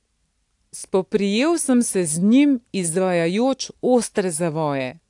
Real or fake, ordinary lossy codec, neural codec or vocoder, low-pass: real; AAC, 64 kbps; none; 10.8 kHz